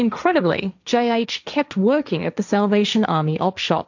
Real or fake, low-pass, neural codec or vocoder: fake; 7.2 kHz; codec, 16 kHz, 1.1 kbps, Voila-Tokenizer